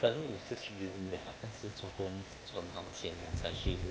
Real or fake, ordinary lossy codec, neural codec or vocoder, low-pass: fake; none; codec, 16 kHz, 0.8 kbps, ZipCodec; none